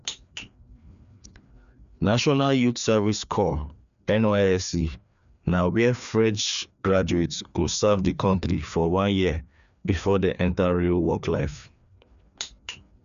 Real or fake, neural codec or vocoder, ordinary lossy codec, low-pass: fake; codec, 16 kHz, 2 kbps, FreqCodec, larger model; none; 7.2 kHz